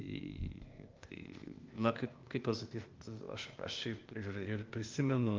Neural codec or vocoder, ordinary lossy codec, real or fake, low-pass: codec, 16 kHz, 0.8 kbps, ZipCodec; Opus, 32 kbps; fake; 7.2 kHz